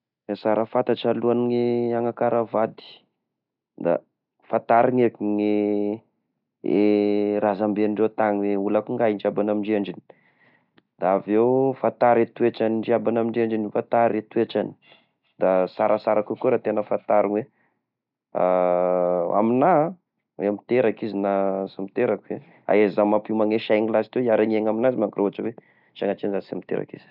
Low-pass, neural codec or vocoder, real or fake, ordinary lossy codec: 5.4 kHz; none; real; none